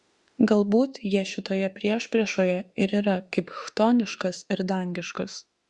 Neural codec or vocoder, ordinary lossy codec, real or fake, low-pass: autoencoder, 48 kHz, 32 numbers a frame, DAC-VAE, trained on Japanese speech; Opus, 64 kbps; fake; 10.8 kHz